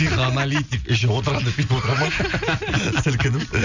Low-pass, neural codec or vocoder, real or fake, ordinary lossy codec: 7.2 kHz; none; real; none